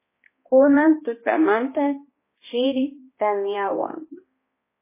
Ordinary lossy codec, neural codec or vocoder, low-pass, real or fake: MP3, 16 kbps; codec, 16 kHz, 1 kbps, X-Codec, HuBERT features, trained on balanced general audio; 3.6 kHz; fake